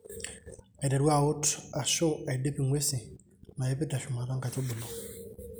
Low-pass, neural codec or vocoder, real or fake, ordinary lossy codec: none; none; real; none